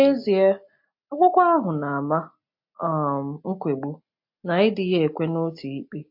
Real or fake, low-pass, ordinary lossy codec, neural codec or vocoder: real; 5.4 kHz; MP3, 32 kbps; none